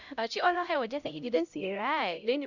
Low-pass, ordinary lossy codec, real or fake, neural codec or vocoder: 7.2 kHz; none; fake; codec, 16 kHz, 0.5 kbps, X-Codec, HuBERT features, trained on LibriSpeech